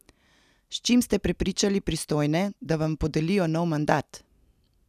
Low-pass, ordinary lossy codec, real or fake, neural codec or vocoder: 14.4 kHz; none; real; none